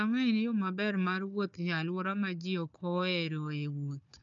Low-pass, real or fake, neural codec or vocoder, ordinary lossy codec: 7.2 kHz; fake; codec, 16 kHz, 4 kbps, FunCodec, trained on Chinese and English, 50 frames a second; none